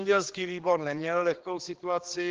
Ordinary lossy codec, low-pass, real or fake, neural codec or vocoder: Opus, 16 kbps; 7.2 kHz; fake; codec, 16 kHz, 2 kbps, X-Codec, HuBERT features, trained on general audio